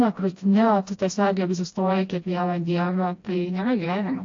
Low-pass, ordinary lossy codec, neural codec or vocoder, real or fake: 7.2 kHz; AAC, 48 kbps; codec, 16 kHz, 1 kbps, FreqCodec, smaller model; fake